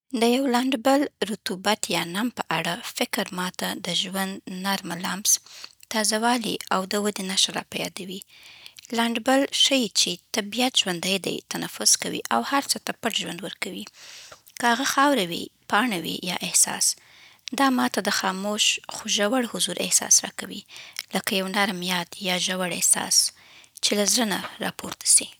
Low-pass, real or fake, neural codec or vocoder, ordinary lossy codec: none; real; none; none